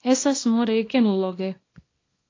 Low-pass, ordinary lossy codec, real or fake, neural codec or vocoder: 7.2 kHz; AAC, 32 kbps; fake; codec, 24 kHz, 0.9 kbps, WavTokenizer, small release